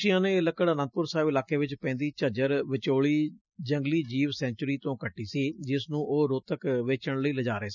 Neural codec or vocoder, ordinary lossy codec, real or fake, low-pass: none; none; real; 7.2 kHz